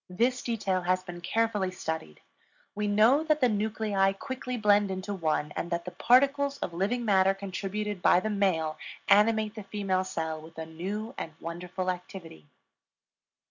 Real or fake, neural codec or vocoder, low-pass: real; none; 7.2 kHz